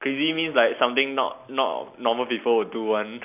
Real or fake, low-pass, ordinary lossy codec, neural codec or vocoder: real; 3.6 kHz; none; none